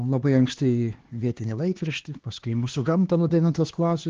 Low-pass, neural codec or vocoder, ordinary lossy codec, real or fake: 7.2 kHz; codec, 16 kHz, 2 kbps, X-Codec, HuBERT features, trained on LibriSpeech; Opus, 16 kbps; fake